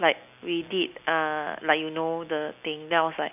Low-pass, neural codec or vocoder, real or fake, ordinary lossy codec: 3.6 kHz; none; real; none